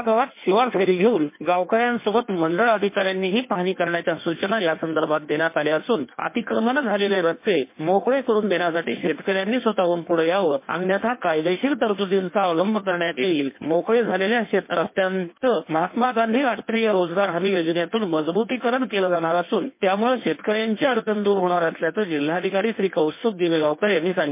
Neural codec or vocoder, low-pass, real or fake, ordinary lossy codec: codec, 16 kHz in and 24 kHz out, 1.1 kbps, FireRedTTS-2 codec; 3.6 kHz; fake; MP3, 24 kbps